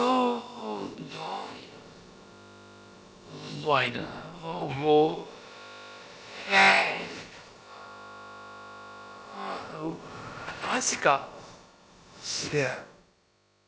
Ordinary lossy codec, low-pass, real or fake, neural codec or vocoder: none; none; fake; codec, 16 kHz, about 1 kbps, DyCAST, with the encoder's durations